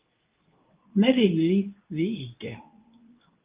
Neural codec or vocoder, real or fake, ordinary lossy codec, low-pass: codec, 24 kHz, 0.9 kbps, WavTokenizer, medium speech release version 2; fake; Opus, 32 kbps; 3.6 kHz